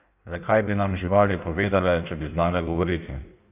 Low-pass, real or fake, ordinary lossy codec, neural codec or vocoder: 3.6 kHz; fake; none; codec, 16 kHz in and 24 kHz out, 1.1 kbps, FireRedTTS-2 codec